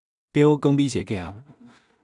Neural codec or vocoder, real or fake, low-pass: codec, 16 kHz in and 24 kHz out, 0.4 kbps, LongCat-Audio-Codec, two codebook decoder; fake; 10.8 kHz